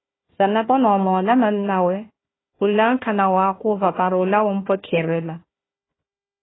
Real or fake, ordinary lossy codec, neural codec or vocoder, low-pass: fake; AAC, 16 kbps; codec, 16 kHz, 1 kbps, FunCodec, trained on Chinese and English, 50 frames a second; 7.2 kHz